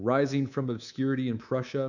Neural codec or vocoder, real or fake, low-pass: codec, 24 kHz, 3.1 kbps, DualCodec; fake; 7.2 kHz